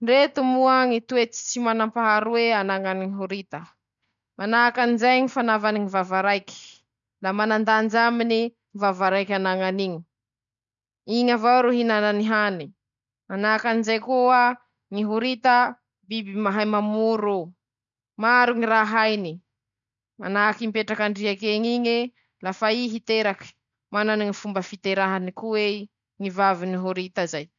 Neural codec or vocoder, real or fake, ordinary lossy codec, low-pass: none; real; none; 7.2 kHz